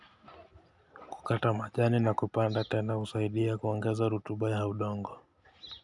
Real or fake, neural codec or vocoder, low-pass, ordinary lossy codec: real; none; 10.8 kHz; none